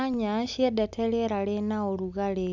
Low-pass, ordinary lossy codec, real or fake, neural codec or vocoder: 7.2 kHz; none; real; none